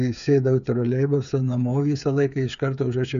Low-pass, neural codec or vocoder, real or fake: 7.2 kHz; codec, 16 kHz, 8 kbps, FreqCodec, smaller model; fake